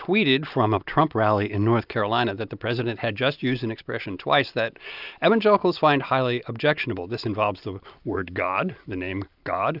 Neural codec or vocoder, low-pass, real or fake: none; 5.4 kHz; real